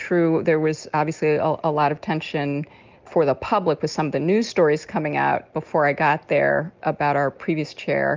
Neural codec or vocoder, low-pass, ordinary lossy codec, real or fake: none; 7.2 kHz; Opus, 24 kbps; real